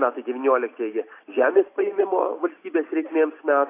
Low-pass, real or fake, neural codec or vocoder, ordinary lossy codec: 3.6 kHz; real; none; AAC, 24 kbps